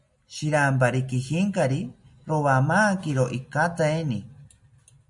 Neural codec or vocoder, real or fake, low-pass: none; real; 10.8 kHz